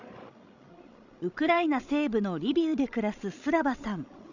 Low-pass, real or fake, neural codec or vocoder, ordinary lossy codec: 7.2 kHz; fake; codec, 16 kHz, 16 kbps, FreqCodec, larger model; none